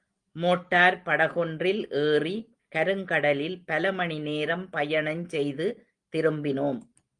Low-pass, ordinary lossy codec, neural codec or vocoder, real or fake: 9.9 kHz; Opus, 24 kbps; none; real